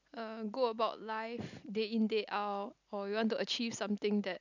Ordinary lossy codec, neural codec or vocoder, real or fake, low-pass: none; none; real; 7.2 kHz